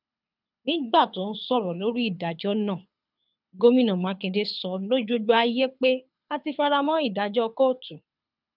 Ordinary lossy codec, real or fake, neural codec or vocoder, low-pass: none; fake; codec, 24 kHz, 6 kbps, HILCodec; 5.4 kHz